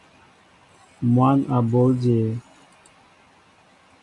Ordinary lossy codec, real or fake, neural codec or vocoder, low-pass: AAC, 48 kbps; real; none; 10.8 kHz